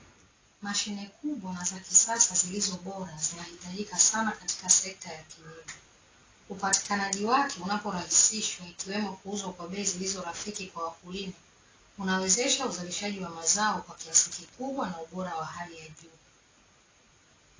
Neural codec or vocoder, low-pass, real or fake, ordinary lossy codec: none; 7.2 kHz; real; AAC, 32 kbps